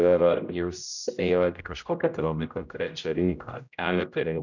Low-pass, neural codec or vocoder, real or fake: 7.2 kHz; codec, 16 kHz, 0.5 kbps, X-Codec, HuBERT features, trained on general audio; fake